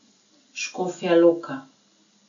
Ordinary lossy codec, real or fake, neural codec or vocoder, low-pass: none; real; none; 7.2 kHz